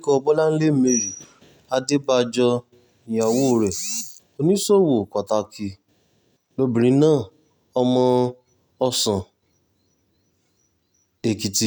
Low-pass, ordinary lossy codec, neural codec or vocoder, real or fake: none; none; none; real